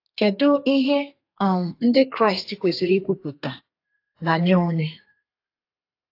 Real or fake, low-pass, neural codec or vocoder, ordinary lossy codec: fake; 5.4 kHz; codec, 32 kHz, 1.9 kbps, SNAC; AAC, 32 kbps